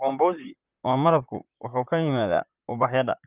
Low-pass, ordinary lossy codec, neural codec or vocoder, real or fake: 3.6 kHz; Opus, 24 kbps; vocoder, 44.1 kHz, 128 mel bands, Pupu-Vocoder; fake